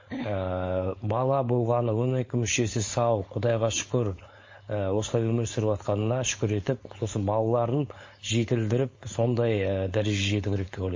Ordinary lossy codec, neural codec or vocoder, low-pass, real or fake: MP3, 32 kbps; codec, 16 kHz, 4.8 kbps, FACodec; 7.2 kHz; fake